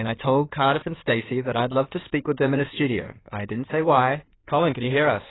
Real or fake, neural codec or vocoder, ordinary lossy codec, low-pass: fake; codec, 16 kHz in and 24 kHz out, 2.2 kbps, FireRedTTS-2 codec; AAC, 16 kbps; 7.2 kHz